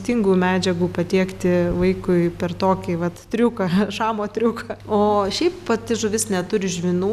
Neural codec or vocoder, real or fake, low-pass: none; real; 14.4 kHz